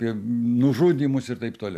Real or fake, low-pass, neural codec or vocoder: real; 14.4 kHz; none